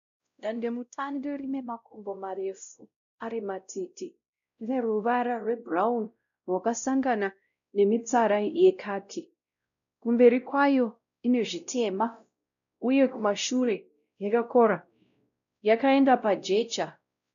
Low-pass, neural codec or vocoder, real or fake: 7.2 kHz; codec, 16 kHz, 0.5 kbps, X-Codec, WavLM features, trained on Multilingual LibriSpeech; fake